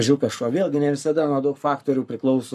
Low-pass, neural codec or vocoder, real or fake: 14.4 kHz; codec, 44.1 kHz, 7.8 kbps, Pupu-Codec; fake